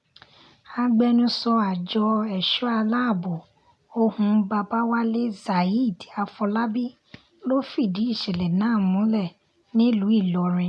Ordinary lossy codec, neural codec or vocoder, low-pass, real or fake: none; none; none; real